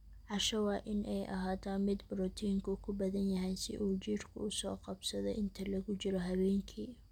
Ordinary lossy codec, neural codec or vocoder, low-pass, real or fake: none; none; 19.8 kHz; real